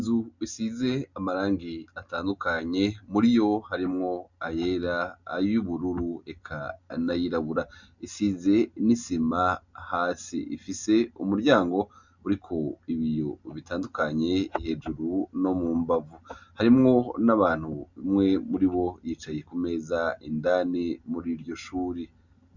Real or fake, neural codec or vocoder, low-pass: real; none; 7.2 kHz